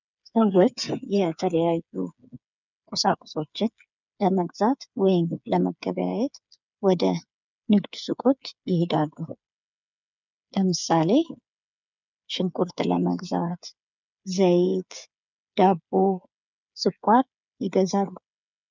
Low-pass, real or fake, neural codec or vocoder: 7.2 kHz; fake; codec, 16 kHz, 8 kbps, FreqCodec, smaller model